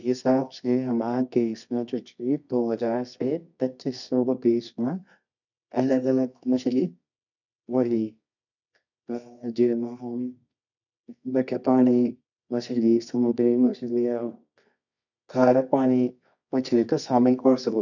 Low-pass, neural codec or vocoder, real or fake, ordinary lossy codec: 7.2 kHz; codec, 24 kHz, 0.9 kbps, WavTokenizer, medium music audio release; fake; none